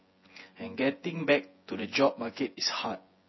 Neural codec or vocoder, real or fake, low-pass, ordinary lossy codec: vocoder, 24 kHz, 100 mel bands, Vocos; fake; 7.2 kHz; MP3, 24 kbps